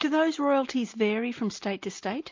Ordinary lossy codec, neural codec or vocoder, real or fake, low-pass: MP3, 48 kbps; none; real; 7.2 kHz